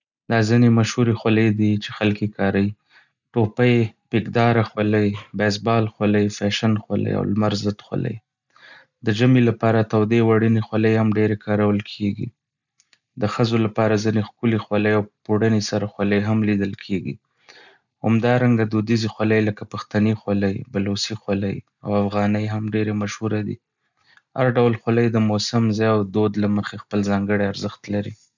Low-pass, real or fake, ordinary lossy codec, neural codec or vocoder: 7.2 kHz; real; none; none